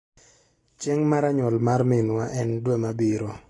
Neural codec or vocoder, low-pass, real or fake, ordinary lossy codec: none; 10.8 kHz; real; AAC, 32 kbps